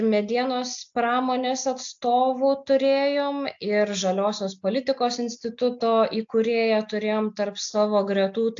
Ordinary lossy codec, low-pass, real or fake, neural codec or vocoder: AAC, 64 kbps; 7.2 kHz; real; none